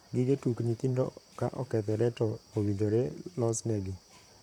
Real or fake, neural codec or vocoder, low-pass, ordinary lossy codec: fake; vocoder, 44.1 kHz, 128 mel bands, Pupu-Vocoder; 19.8 kHz; none